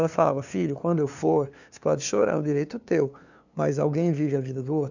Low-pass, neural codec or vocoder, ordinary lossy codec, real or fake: 7.2 kHz; codec, 16 kHz, 2 kbps, FunCodec, trained on LibriTTS, 25 frames a second; none; fake